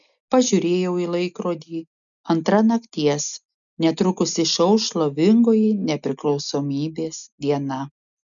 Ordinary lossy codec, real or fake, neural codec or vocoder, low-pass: MP3, 96 kbps; real; none; 7.2 kHz